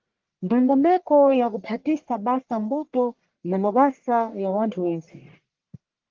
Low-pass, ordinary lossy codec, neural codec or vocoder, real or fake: 7.2 kHz; Opus, 16 kbps; codec, 44.1 kHz, 1.7 kbps, Pupu-Codec; fake